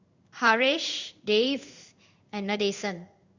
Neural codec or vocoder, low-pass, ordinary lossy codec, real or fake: codec, 16 kHz in and 24 kHz out, 1 kbps, XY-Tokenizer; 7.2 kHz; Opus, 64 kbps; fake